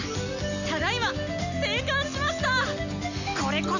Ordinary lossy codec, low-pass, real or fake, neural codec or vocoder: none; 7.2 kHz; real; none